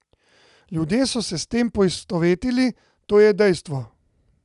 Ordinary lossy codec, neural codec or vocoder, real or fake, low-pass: none; none; real; 10.8 kHz